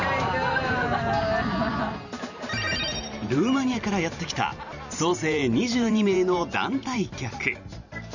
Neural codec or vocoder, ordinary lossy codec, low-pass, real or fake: vocoder, 44.1 kHz, 128 mel bands every 512 samples, BigVGAN v2; none; 7.2 kHz; fake